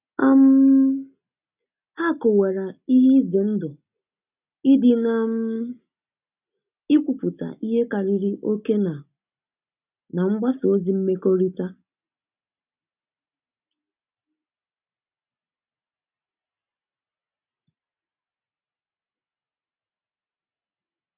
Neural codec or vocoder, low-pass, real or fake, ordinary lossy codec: none; 3.6 kHz; real; none